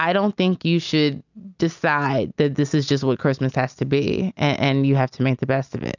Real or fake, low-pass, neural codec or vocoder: real; 7.2 kHz; none